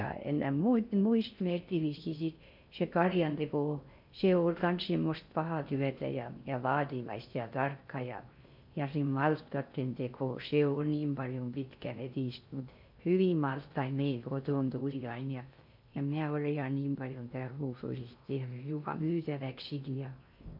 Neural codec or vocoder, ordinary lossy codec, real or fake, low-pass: codec, 16 kHz in and 24 kHz out, 0.6 kbps, FocalCodec, streaming, 4096 codes; MP3, 48 kbps; fake; 5.4 kHz